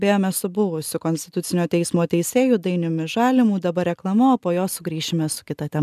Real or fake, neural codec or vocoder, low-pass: real; none; 14.4 kHz